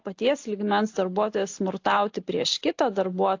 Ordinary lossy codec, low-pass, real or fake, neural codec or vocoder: AAC, 48 kbps; 7.2 kHz; real; none